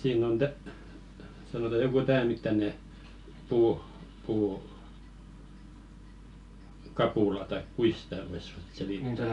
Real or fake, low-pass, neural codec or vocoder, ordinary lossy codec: real; 10.8 kHz; none; none